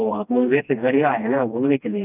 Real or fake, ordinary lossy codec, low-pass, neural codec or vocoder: fake; none; 3.6 kHz; codec, 16 kHz, 1 kbps, FreqCodec, smaller model